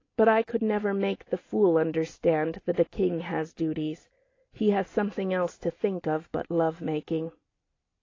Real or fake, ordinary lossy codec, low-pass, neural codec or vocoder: real; AAC, 32 kbps; 7.2 kHz; none